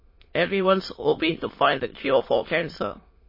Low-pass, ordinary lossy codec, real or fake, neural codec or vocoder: 5.4 kHz; MP3, 24 kbps; fake; autoencoder, 22.05 kHz, a latent of 192 numbers a frame, VITS, trained on many speakers